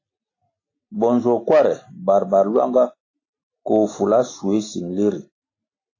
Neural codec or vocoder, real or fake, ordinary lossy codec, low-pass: none; real; AAC, 32 kbps; 7.2 kHz